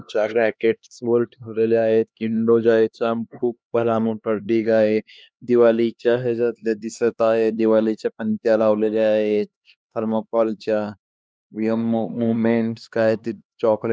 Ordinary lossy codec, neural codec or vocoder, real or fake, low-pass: none; codec, 16 kHz, 2 kbps, X-Codec, HuBERT features, trained on LibriSpeech; fake; none